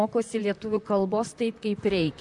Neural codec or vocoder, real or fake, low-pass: vocoder, 44.1 kHz, 128 mel bands, Pupu-Vocoder; fake; 10.8 kHz